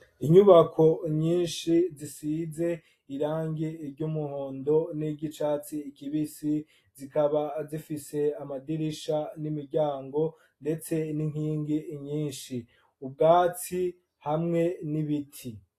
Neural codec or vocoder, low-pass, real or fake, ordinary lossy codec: none; 14.4 kHz; real; AAC, 48 kbps